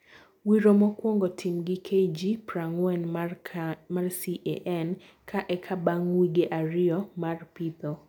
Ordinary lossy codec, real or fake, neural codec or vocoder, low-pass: none; real; none; 19.8 kHz